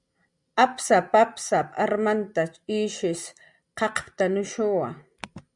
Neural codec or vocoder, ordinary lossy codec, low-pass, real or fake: none; Opus, 64 kbps; 10.8 kHz; real